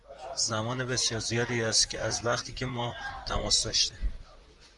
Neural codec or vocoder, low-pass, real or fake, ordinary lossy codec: vocoder, 44.1 kHz, 128 mel bands, Pupu-Vocoder; 10.8 kHz; fake; MP3, 96 kbps